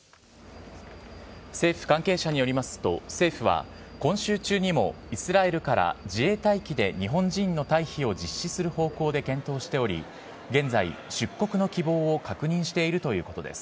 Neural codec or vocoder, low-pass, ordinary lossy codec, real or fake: none; none; none; real